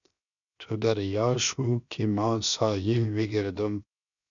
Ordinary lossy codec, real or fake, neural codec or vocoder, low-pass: MP3, 96 kbps; fake; codec, 16 kHz, 0.7 kbps, FocalCodec; 7.2 kHz